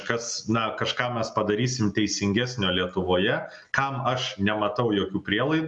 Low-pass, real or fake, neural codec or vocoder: 10.8 kHz; real; none